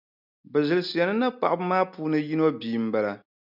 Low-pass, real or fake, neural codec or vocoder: 5.4 kHz; real; none